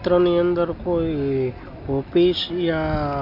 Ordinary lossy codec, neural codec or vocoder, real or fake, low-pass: MP3, 48 kbps; none; real; 5.4 kHz